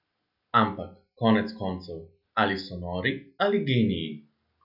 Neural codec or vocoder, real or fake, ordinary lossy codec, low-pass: none; real; none; 5.4 kHz